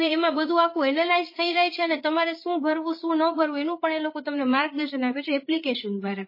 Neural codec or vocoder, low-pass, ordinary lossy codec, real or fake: codec, 16 kHz, 8 kbps, FreqCodec, larger model; 5.4 kHz; MP3, 24 kbps; fake